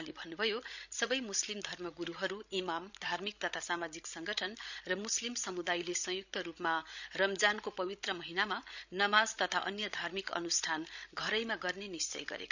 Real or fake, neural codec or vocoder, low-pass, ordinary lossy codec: fake; codec, 16 kHz, 16 kbps, FreqCodec, larger model; 7.2 kHz; none